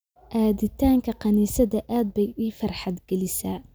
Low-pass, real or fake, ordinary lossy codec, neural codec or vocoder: none; real; none; none